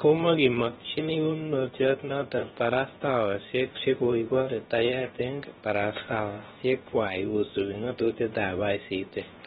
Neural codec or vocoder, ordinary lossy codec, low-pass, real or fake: codec, 16 kHz, about 1 kbps, DyCAST, with the encoder's durations; AAC, 16 kbps; 7.2 kHz; fake